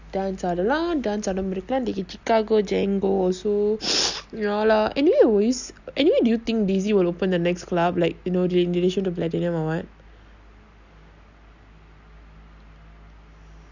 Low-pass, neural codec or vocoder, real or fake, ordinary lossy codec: 7.2 kHz; none; real; none